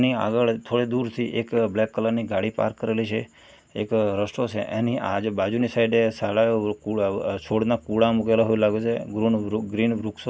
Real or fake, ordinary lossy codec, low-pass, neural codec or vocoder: real; none; none; none